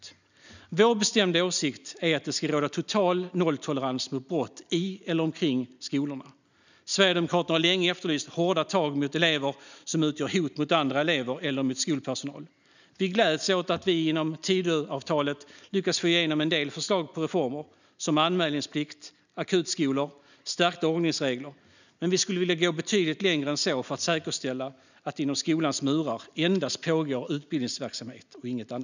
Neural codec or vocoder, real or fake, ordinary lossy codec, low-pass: none; real; none; 7.2 kHz